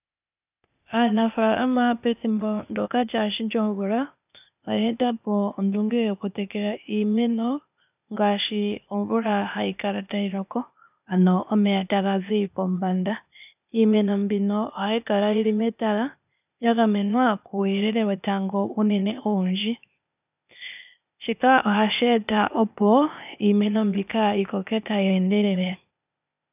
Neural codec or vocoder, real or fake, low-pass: codec, 16 kHz, 0.8 kbps, ZipCodec; fake; 3.6 kHz